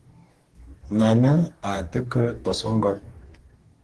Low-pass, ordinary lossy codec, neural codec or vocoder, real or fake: 10.8 kHz; Opus, 16 kbps; codec, 44.1 kHz, 2.6 kbps, DAC; fake